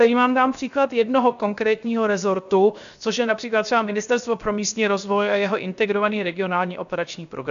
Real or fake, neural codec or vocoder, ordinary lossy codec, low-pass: fake; codec, 16 kHz, 0.7 kbps, FocalCodec; MP3, 96 kbps; 7.2 kHz